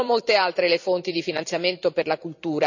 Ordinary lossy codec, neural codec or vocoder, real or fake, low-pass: none; vocoder, 44.1 kHz, 128 mel bands every 256 samples, BigVGAN v2; fake; 7.2 kHz